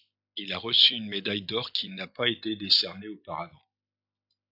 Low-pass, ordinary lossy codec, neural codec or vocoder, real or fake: 5.4 kHz; AAC, 48 kbps; none; real